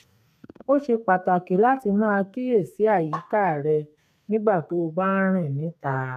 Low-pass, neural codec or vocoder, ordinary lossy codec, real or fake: 14.4 kHz; codec, 32 kHz, 1.9 kbps, SNAC; none; fake